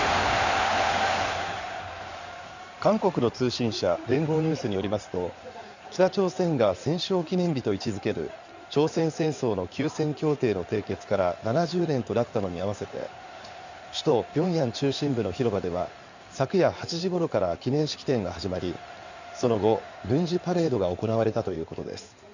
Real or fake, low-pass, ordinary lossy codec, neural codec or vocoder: fake; 7.2 kHz; none; codec, 16 kHz in and 24 kHz out, 2.2 kbps, FireRedTTS-2 codec